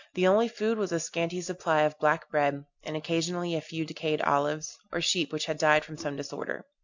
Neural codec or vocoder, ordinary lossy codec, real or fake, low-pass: none; MP3, 64 kbps; real; 7.2 kHz